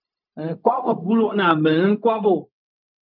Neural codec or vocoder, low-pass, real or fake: codec, 16 kHz, 0.4 kbps, LongCat-Audio-Codec; 5.4 kHz; fake